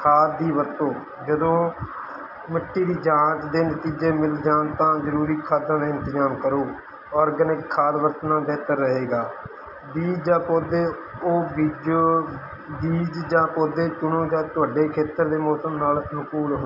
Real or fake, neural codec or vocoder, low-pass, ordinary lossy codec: real; none; 5.4 kHz; none